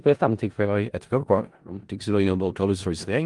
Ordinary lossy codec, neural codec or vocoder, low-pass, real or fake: Opus, 24 kbps; codec, 16 kHz in and 24 kHz out, 0.4 kbps, LongCat-Audio-Codec, four codebook decoder; 10.8 kHz; fake